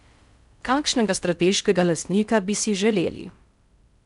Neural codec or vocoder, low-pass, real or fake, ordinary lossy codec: codec, 16 kHz in and 24 kHz out, 0.6 kbps, FocalCodec, streaming, 4096 codes; 10.8 kHz; fake; none